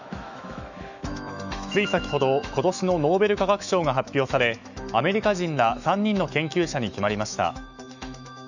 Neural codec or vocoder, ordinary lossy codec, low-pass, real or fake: autoencoder, 48 kHz, 128 numbers a frame, DAC-VAE, trained on Japanese speech; none; 7.2 kHz; fake